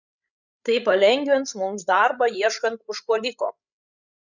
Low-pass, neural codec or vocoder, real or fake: 7.2 kHz; codec, 16 kHz, 16 kbps, FreqCodec, larger model; fake